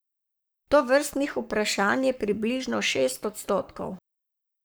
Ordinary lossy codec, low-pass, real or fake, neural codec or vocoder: none; none; fake; codec, 44.1 kHz, 7.8 kbps, Pupu-Codec